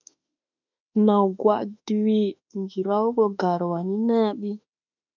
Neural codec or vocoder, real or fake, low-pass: autoencoder, 48 kHz, 32 numbers a frame, DAC-VAE, trained on Japanese speech; fake; 7.2 kHz